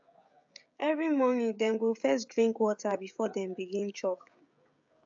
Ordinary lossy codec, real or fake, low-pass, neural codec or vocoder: AAC, 64 kbps; fake; 7.2 kHz; codec, 16 kHz, 16 kbps, FreqCodec, smaller model